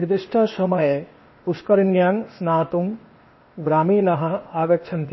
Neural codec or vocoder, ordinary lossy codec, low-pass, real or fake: codec, 16 kHz, 0.8 kbps, ZipCodec; MP3, 24 kbps; 7.2 kHz; fake